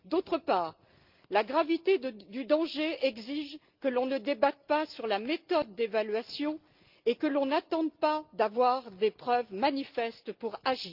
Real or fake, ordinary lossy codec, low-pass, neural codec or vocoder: real; Opus, 32 kbps; 5.4 kHz; none